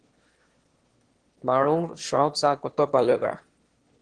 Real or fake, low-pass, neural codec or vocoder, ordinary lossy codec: fake; 9.9 kHz; autoencoder, 22.05 kHz, a latent of 192 numbers a frame, VITS, trained on one speaker; Opus, 16 kbps